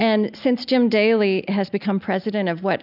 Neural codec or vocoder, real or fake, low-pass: none; real; 5.4 kHz